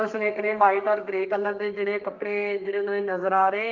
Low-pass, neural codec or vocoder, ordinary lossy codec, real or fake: 7.2 kHz; codec, 32 kHz, 1.9 kbps, SNAC; Opus, 24 kbps; fake